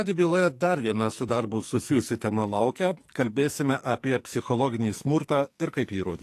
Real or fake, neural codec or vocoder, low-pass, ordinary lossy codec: fake; codec, 44.1 kHz, 2.6 kbps, SNAC; 14.4 kHz; AAC, 64 kbps